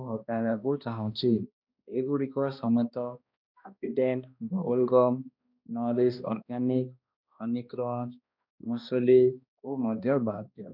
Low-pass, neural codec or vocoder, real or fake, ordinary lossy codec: 5.4 kHz; codec, 16 kHz, 1 kbps, X-Codec, HuBERT features, trained on balanced general audio; fake; none